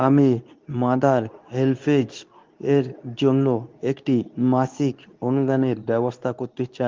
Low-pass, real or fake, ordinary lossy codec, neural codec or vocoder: 7.2 kHz; fake; Opus, 32 kbps; codec, 24 kHz, 0.9 kbps, WavTokenizer, medium speech release version 2